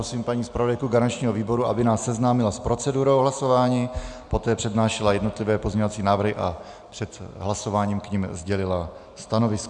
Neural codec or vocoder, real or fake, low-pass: none; real; 9.9 kHz